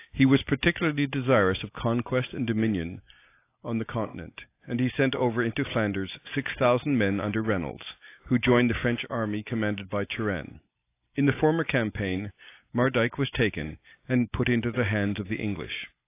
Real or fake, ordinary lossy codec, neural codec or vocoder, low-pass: real; AAC, 24 kbps; none; 3.6 kHz